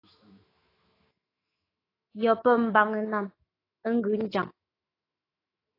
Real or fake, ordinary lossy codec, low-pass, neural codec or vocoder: fake; AAC, 24 kbps; 5.4 kHz; autoencoder, 48 kHz, 128 numbers a frame, DAC-VAE, trained on Japanese speech